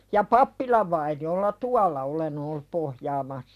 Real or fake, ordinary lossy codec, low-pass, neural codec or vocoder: real; none; 14.4 kHz; none